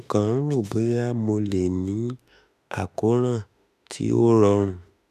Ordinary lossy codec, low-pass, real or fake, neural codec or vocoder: none; 14.4 kHz; fake; autoencoder, 48 kHz, 32 numbers a frame, DAC-VAE, trained on Japanese speech